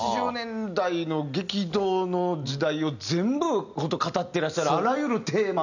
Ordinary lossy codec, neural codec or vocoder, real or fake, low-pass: none; none; real; 7.2 kHz